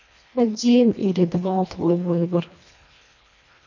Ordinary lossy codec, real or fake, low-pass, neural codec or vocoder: none; fake; 7.2 kHz; codec, 24 kHz, 1.5 kbps, HILCodec